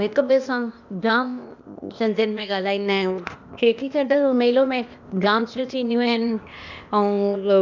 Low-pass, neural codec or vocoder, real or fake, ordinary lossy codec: 7.2 kHz; codec, 16 kHz, 0.8 kbps, ZipCodec; fake; none